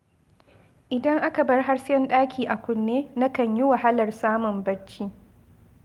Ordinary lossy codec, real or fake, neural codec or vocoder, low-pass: Opus, 32 kbps; real; none; 19.8 kHz